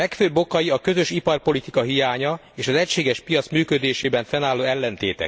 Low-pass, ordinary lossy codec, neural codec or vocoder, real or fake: none; none; none; real